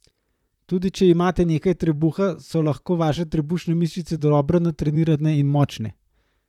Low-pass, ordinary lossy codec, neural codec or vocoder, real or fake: 19.8 kHz; none; vocoder, 44.1 kHz, 128 mel bands, Pupu-Vocoder; fake